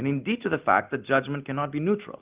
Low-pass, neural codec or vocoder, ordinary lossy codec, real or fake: 3.6 kHz; none; Opus, 32 kbps; real